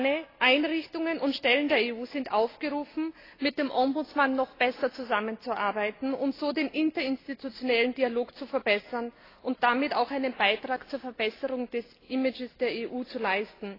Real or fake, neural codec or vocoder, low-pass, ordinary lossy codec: real; none; 5.4 kHz; AAC, 24 kbps